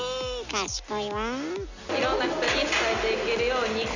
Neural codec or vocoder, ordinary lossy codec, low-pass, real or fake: none; none; 7.2 kHz; real